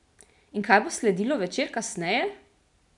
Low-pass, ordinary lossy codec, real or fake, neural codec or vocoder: 10.8 kHz; none; real; none